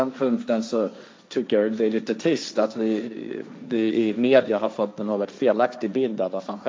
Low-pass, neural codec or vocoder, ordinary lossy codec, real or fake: none; codec, 16 kHz, 1.1 kbps, Voila-Tokenizer; none; fake